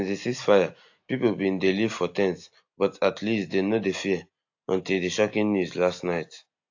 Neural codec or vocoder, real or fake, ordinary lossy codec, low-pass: none; real; AAC, 48 kbps; 7.2 kHz